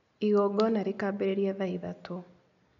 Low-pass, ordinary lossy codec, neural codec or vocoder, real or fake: 7.2 kHz; none; none; real